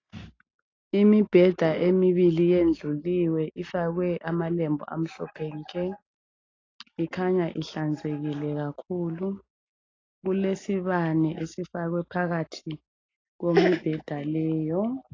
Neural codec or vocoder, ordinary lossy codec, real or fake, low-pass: none; AAC, 32 kbps; real; 7.2 kHz